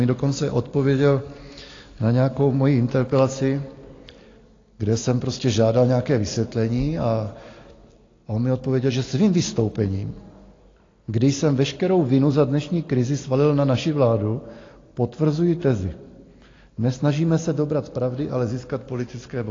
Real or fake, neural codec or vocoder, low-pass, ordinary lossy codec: real; none; 7.2 kHz; AAC, 32 kbps